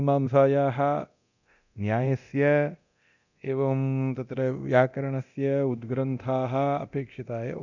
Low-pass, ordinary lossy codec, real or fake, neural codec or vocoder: 7.2 kHz; none; fake; codec, 24 kHz, 0.9 kbps, DualCodec